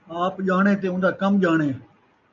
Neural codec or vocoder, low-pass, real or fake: none; 7.2 kHz; real